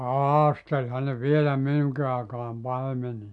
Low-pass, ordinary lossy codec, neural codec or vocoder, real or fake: 10.8 kHz; none; none; real